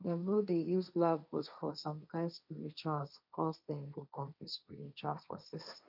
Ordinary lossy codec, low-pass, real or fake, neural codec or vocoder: none; 5.4 kHz; fake; codec, 16 kHz, 1.1 kbps, Voila-Tokenizer